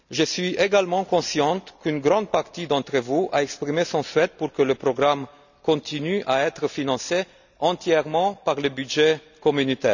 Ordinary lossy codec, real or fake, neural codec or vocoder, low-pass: none; real; none; 7.2 kHz